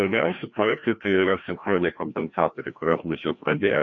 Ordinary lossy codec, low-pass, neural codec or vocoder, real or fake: AAC, 48 kbps; 7.2 kHz; codec, 16 kHz, 1 kbps, FreqCodec, larger model; fake